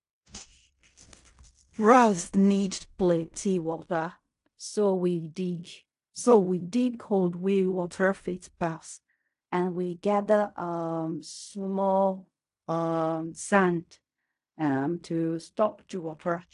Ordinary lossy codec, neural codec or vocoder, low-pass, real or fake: AAC, 64 kbps; codec, 16 kHz in and 24 kHz out, 0.4 kbps, LongCat-Audio-Codec, fine tuned four codebook decoder; 10.8 kHz; fake